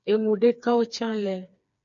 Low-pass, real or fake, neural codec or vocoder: 7.2 kHz; fake; codec, 16 kHz, 4 kbps, FreqCodec, smaller model